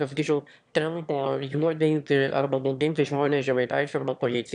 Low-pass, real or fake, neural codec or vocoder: 9.9 kHz; fake; autoencoder, 22.05 kHz, a latent of 192 numbers a frame, VITS, trained on one speaker